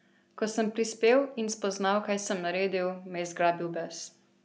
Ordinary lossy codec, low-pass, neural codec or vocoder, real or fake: none; none; none; real